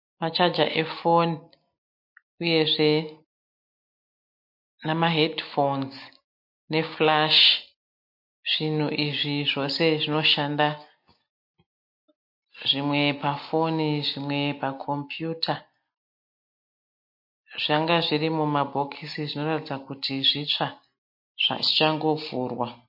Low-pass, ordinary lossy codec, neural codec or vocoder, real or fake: 5.4 kHz; MP3, 32 kbps; none; real